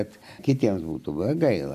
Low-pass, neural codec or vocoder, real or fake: 14.4 kHz; none; real